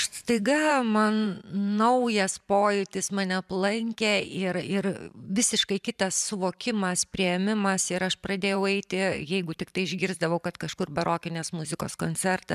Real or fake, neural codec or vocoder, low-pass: fake; vocoder, 44.1 kHz, 128 mel bands every 512 samples, BigVGAN v2; 14.4 kHz